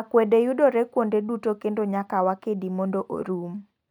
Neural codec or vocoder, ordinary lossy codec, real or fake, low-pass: none; none; real; 19.8 kHz